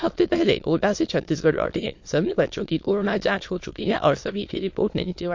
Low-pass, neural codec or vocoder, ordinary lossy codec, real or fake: 7.2 kHz; autoencoder, 22.05 kHz, a latent of 192 numbers a frame, VITS, trained on many speakers; MP3, 48 kbps; fake